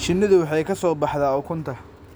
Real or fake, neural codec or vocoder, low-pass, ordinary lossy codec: real; none; none; none